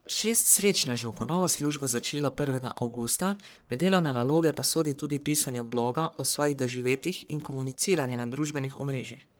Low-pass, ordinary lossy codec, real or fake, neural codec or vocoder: none; none; fake; codec, 44.1 kHz, 1.7 kbps, Pupu-Codec